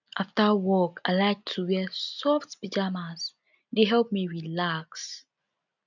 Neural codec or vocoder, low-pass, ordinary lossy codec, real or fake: none; 7.2 kHz; none; real